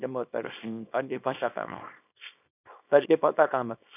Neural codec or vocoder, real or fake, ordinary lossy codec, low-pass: codec, 24 kHz, 0.9 kbps, WavTokenizer, small release; fake; none; 3.6 kHz